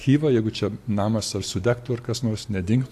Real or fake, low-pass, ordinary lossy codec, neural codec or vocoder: real; 14.4 kHz; AAC, 64 kbps; none